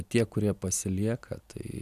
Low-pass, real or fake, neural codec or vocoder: 14.4 kHz; real; none